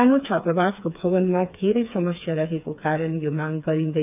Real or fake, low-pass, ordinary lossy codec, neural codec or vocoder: fake; 3.6 kHz; none; codec, 16 kHz, 4 kbps, FreqCodec, smaller model